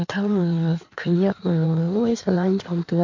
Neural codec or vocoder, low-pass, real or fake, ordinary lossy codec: codec, 16 kHz in and 24 kHz out, 1.1 kbps, FireRedTTS-2 codec; 7.2 kHz; fake; MP3, 48 kbps